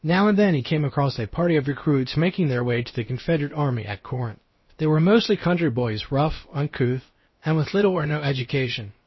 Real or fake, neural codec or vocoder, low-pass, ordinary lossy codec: fake; codec, 16 kHz, about 1 kbps, DyCAST, with the encoder's durations; 7.2 kHz; MP3, 24 kbps